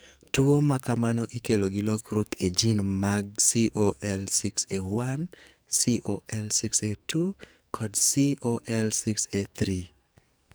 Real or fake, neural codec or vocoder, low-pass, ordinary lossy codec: fake; codec, 44.1 kHz, 2.6 kbps, SNAC; none; none